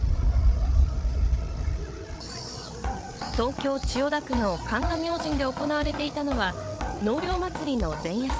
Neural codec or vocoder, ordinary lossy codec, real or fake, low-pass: codec, 16 kHz, 8 kbps, FreqCodec, larger model; none; fake; none